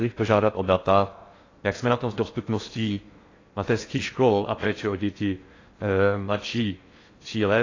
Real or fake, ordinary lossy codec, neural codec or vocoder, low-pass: fake; AAC, 32 kbps; codec, 16 kHz in and 24 kHz out, 0.6 kbps, FocalCodec, streaming, 4096 codes; 7.2 kHz